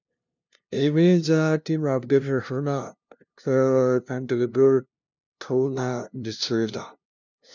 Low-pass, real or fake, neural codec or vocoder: 7.2 kHz; fake; codec, 16 kHz, 0.5 kbps, FunCodec, trained on LibriTTS, 25 frames a second